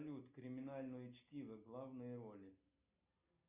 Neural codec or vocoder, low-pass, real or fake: none; 3.6 kHz; real